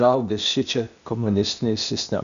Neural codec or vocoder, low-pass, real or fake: codec, 16 kHz, 0.8 kbps, ZipCodec; 7.2 kHz; fake